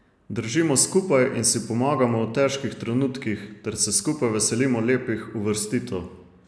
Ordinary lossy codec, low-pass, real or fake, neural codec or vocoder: none; none; real; none